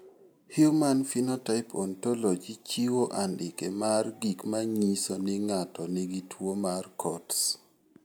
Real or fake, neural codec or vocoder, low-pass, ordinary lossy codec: real; none; none; none